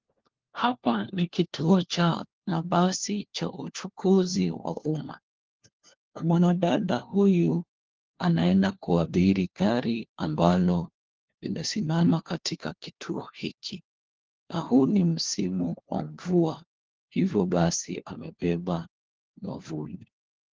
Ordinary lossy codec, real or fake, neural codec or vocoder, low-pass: Opus, 16 kbps; fake; codec, 16 kHz, 1 kbps, FunCodec, trained on LibriTTS, 50 frames a second; 7.2 kHz